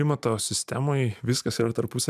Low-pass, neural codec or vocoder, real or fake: 14.4 kHz; none; real